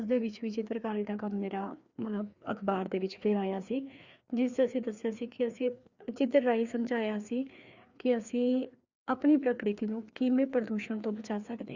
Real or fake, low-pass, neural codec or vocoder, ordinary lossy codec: fake; 7.2 kHz; codec, 16 kHz, 2 kbps, FreqCodec, larger model; Opus, 64 kbps